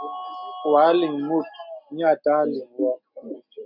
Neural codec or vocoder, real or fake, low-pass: none; real; 5.4 kHz